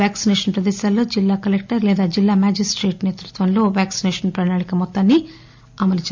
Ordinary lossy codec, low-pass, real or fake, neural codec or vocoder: MP3, 64 kbps; 7.2 kHz; real; none